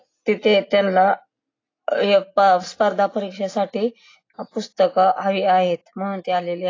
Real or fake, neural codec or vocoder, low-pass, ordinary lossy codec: real; none; 7.2 kHz; AAC, 32 kbps